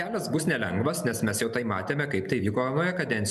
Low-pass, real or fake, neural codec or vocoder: 14.4 kHz; real; none